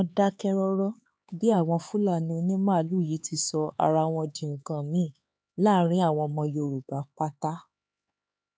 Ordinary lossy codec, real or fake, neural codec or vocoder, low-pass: none; fake; codec, 16 kHz, 4 kbps, X-Codec, HuBERT features, trained on LibriSpeech; none